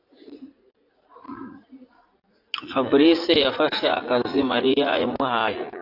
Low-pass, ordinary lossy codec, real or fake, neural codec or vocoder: 5.4 kHz; AAC, 32 kbps; fake; vocoder, 44.1 kHz, 80 mel bands, Vocos